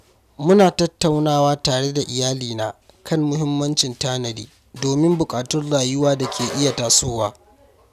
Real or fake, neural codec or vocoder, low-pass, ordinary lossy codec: real; none; 14.4 kHz; none